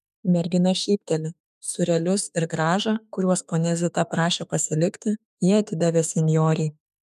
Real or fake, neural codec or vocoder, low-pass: fake; autoencoder, 48 kHz, 32 numbers a frame, DAC-VAE, trained on Japanese speech; 14.4 kHz